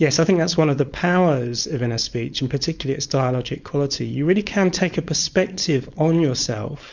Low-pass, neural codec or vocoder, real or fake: 7.2 kHz; codec, 16 kHz, 4.8 kbps, FACodec; fake